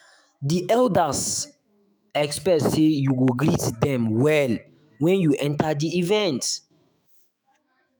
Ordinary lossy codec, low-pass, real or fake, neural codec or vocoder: none; none; fake; autoencoder, 48 kHz, 128 numbers a frame, DAC-VAE, trained on Japanese speech